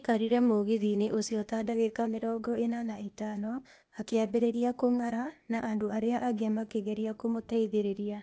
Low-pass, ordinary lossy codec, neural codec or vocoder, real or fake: none; none; codec, 16 kHz, 0.8 kbps, ZipCodec; fake